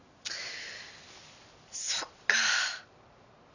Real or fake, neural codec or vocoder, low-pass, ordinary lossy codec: real; none; 7.2 kHz; none